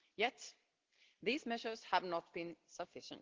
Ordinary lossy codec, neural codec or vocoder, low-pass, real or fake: Opus, 16 kbps; none; 7.2 kHz; real